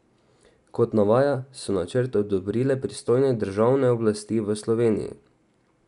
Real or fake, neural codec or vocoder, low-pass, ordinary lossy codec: real; none; 10.8 kHz; none